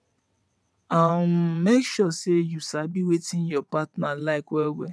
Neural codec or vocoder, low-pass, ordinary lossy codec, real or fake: vocoder, 22.05 kHz, 80 mel bands, WaveNeXt; none; none; fake